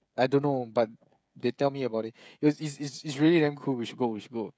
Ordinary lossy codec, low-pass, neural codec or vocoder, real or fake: none; none; codec, 16 kHz, 16 kbps, FreqCodec, smaller model; fake